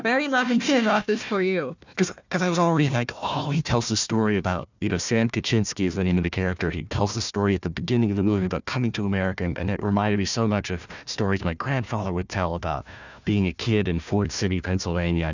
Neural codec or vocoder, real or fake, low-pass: codec, 16 kHz, 1 kbps, FunCodec, trained on Chinese and English, 50 frames a second; fake; 7.2 kHz